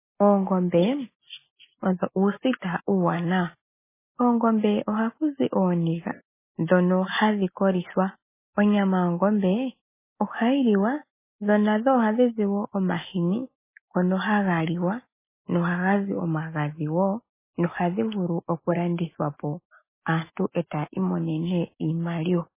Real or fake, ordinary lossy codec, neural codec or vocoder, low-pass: real; MP3, 16 kbps; none; 3.6 kHz